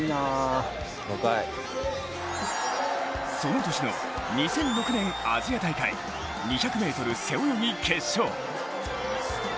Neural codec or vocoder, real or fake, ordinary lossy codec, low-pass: none; real; none; none